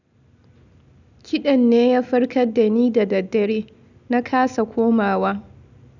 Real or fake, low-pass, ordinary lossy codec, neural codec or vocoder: real; 7.2 kHz; none; none